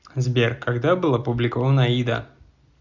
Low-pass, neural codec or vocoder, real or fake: 7.2 kHz; none; real